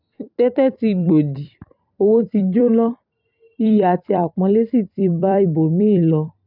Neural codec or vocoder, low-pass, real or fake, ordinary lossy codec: vocoder, 44.1 kHz, 128 mel bands every 256 samples, BigVGAN v2; 5.4 kHz; fake; none